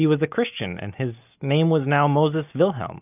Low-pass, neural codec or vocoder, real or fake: 3.6 kHz; none; real